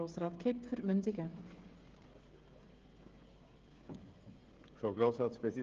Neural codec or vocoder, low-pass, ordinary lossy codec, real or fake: codec, 16 kHz, 16 kbps, FreqCodec, smaller model; 7.2 kHz; Opus, 16 kbps; fake